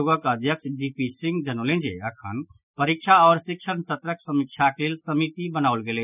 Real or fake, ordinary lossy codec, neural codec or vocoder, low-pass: real; none; none; 3.6 kHz